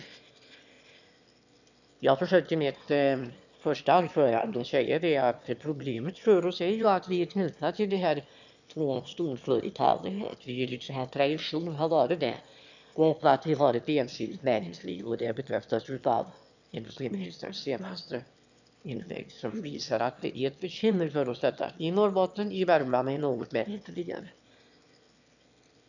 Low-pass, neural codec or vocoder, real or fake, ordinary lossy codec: 7.2 kHz; autoencoder, 22.05 kHz, a latent of 192 numbers a frame, VITS, trained on one speaker; fake; none